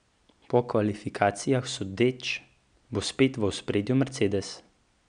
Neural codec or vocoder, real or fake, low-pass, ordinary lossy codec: none; real; 9.9 kHz; none